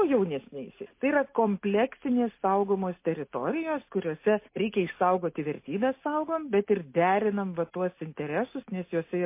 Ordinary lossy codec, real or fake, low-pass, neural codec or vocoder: MP3, 24 kbps; real; 3.6 kHz; none